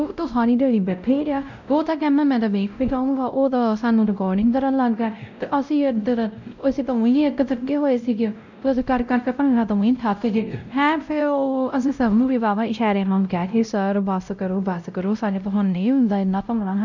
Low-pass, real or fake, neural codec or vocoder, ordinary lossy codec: 7.2 kHz; fake; codec, 16 kHz, 0.5 kbps, X-Codec, WavLM features, trained on Multilingual LibriSpeech; none